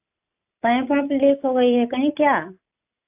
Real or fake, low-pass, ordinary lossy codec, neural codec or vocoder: real; 3.6 kHz; AAC, 32 kbps; none